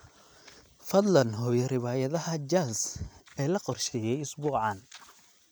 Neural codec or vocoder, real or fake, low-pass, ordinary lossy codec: none; real; none; none